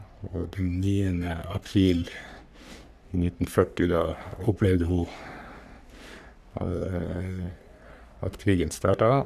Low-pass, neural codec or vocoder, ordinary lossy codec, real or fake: 14.4 kHz; codec, 44.1 kHz, 3.4 kbps, Pupu-Codec; none; fake